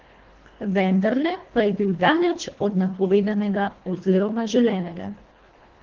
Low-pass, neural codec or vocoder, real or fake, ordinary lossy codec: 7.2 kHz; codec, 24 kHz, 1.5 kbps, HILCodec; fake; Opus, 16 kbps